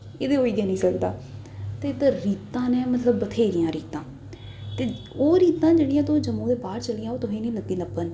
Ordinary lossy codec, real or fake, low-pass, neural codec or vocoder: none; real; none; none